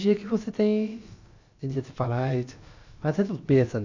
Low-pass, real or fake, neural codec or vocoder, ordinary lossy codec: 7.2 kHz; fake; codec, 16 kHz, about 1 kbps, DyCAST, with the encoder's durations; Opus, 64 kbps